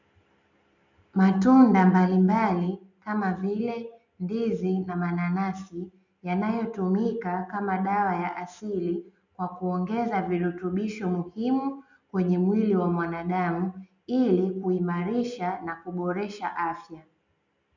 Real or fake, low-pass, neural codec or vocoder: real; 7.2 kHz; none